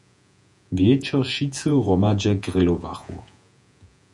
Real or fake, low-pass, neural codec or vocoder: fake; 10.8 kHz; vocoder, 48 kHz, 128 mel bands, Vocos